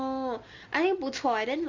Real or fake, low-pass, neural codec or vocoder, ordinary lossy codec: real; 7.2 kHz; none; Opus, 32 kbps